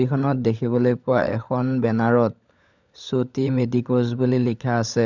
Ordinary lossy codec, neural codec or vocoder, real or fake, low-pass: none; codec, 16 kHz, 16 kbps, FunCodec, trained on LibriTTS, 50 frames a second; fake; 7.2 kHz